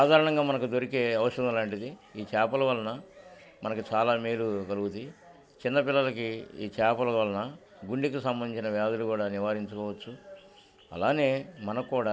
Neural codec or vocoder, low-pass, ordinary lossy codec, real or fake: none; none; none; real